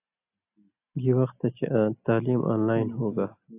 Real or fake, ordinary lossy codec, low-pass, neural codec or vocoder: real; AAC, 32 kbps; 3.6 kHz; none